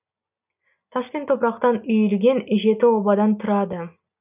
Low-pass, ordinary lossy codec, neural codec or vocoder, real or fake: 3.6 kHz; none; none; real